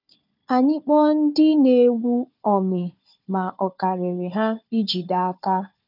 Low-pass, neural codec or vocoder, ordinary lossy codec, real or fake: 5.4 kHz; codec, 16 kHz, 4 kbps, FunCodec, trained on Chinese and English, 50 frames a second; none; fake